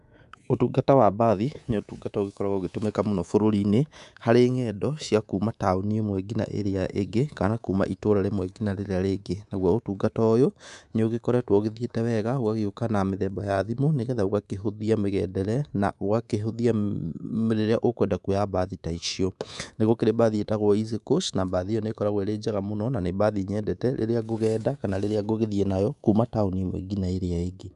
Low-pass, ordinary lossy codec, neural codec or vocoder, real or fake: 10.8 kHz; none; codec, 24 kHz, 3.1 kbps, DualCodec; fake